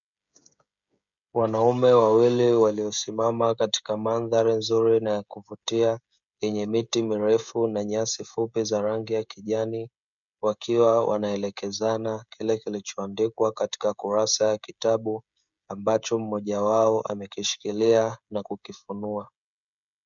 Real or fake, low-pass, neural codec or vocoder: fake; 7.2 kHz; codec, 16 kHz, 16 kbps, FreqCodec, smaller model